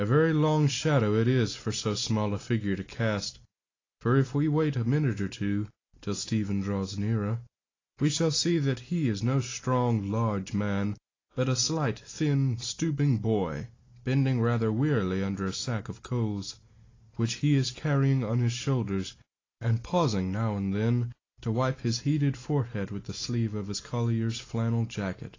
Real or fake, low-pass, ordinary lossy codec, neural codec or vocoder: real; 7.2 kHz; AAC, 32 kbps; none